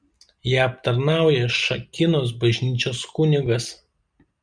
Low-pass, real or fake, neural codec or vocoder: 9.9 kHz; real; none